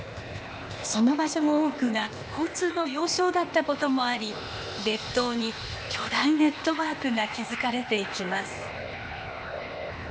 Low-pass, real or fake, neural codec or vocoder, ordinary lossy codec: none; fake; codec, 16 kHz, 0.8 kbps, ZipCodec; none